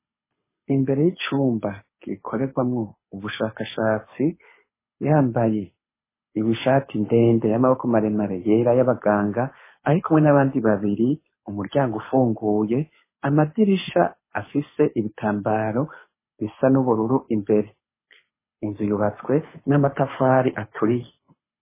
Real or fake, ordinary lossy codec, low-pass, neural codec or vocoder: fake; MP3, 16 kbps; 3.6 kHz; codec, 24 kHz, 6 kbps, HILCodec